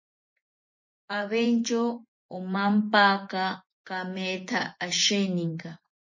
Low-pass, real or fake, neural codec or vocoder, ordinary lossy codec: 7.2 kHz; fake; vocoder, 24 kHz, 100 mel bands, Vocos; MP3, 32 kbps